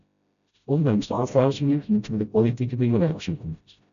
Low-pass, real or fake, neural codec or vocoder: 7.2 kHz; fake; codec, 16 kHz, 0.5 kbps, FreqCodec, smaller model